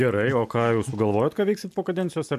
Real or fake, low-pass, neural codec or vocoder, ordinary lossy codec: real; 14.4 kHz; none; MP3, 96 kbps